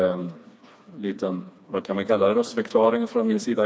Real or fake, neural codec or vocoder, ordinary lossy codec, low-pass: fake; codec, 16 kHz, 2 kbps, FreqCodec, smaller model; none; none